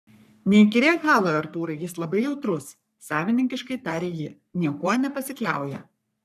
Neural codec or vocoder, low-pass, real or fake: codec, 44.1 kHz, 3.4 kbps, Pupu-Codec; 14.4 kHz; fake